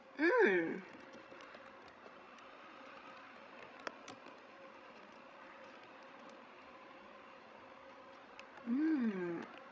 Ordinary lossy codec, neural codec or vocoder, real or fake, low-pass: none; codec, 16 kHz, 16 kbps, FreqCodec, larger model; fake; none